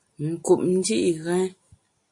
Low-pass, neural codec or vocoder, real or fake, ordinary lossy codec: 10.8 kHz; none; real; MP3, 48 kbps